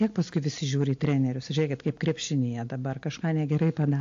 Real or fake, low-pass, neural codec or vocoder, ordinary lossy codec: real; 7.2 kHz; none; AAC, 48 kbps